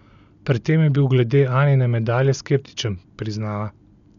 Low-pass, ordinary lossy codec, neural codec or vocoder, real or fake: 7.2 kHz; none; none; real